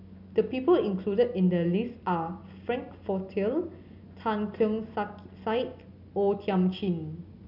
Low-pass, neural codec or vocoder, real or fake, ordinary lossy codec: 5.4 kHz; none; real; none